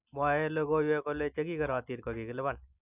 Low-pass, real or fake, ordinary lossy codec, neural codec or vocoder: 3.6 kHz; real; none; none